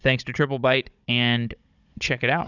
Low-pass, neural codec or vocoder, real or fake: 7.2 kHz; codec, 16 kHz, 16 kbps, FunCodec, trained on Chinese and English, 50 frames a second; fake